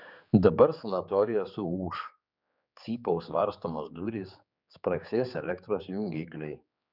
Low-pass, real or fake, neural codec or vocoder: 5.4 kHz; fake; codec, 16 kHz, 4 kbps, X-Codec, HuBERT features, trained on general audio